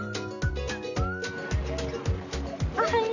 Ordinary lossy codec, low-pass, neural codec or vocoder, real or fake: none; 7.2 kHz; none; real